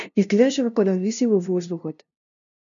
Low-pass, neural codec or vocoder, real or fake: 7.2 kHz; codec, 16 kHz, 0.5 kbps, FunCodec, trained on LibriTTS, 25 frames a second; fake